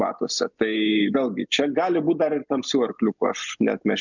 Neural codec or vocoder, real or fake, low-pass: none; real; 7.2 kHz